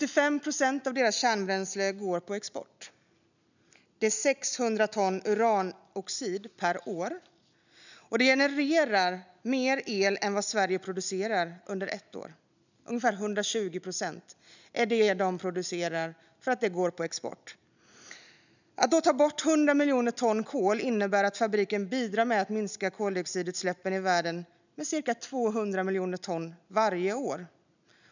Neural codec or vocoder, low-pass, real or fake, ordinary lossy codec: none; 7.2 kHz; real; none